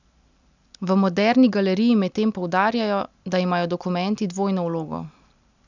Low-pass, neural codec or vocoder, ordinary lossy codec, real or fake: 7.2 kHz; none; none; real